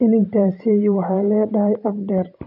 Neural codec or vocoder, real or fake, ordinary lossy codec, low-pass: vocoder, 44.1 kHz, 128 mel bands every 512 samples, BigVGAN v2; fake; none; 5.4 kHz